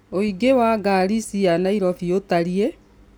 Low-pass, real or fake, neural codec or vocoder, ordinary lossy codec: none; real; none; none